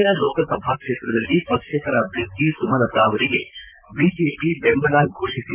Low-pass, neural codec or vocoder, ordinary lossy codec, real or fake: 3.6 kHz; vocoder, 44.1 kHz, 128 mel bands, Pupu-Vocoder; Opus, 64 kbps; fake